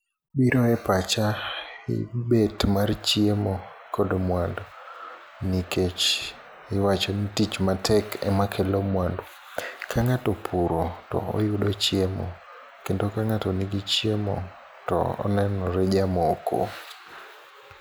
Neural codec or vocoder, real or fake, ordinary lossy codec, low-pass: none; real; none; none